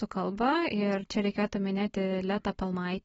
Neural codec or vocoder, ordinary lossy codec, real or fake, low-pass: none; AAC, 24 kbps; real; 19.8 kHz